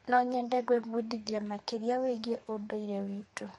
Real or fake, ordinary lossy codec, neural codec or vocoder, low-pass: fake; MP3, 48 kbps; codec, 32 kHz, 1.9 kbps, SNAC; 14.4 kHz